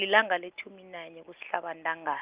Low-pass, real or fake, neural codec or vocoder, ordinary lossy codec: 3.6 kHz; real; none; Opus, 24 kbps